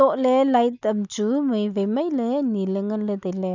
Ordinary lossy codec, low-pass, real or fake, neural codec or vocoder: none; 7.2 kHz; real; none